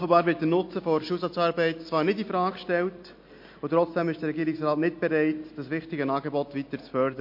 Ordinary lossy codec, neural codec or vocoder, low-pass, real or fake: MP3, 32 kbps; none; 5.4 kHz; real